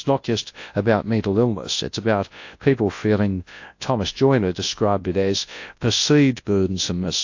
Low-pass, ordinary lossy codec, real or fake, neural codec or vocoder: 7.2 kHz; AAC, 48 kbps; fake; codec, 24 kHz, 0.9 kbps, WavTokenizer, large speech release